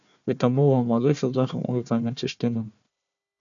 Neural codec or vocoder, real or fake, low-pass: codec, 16 kHz, 1 kbps, FunCodec, trained on Chinese and English, 50 frames a second; fake; 7.2 kHz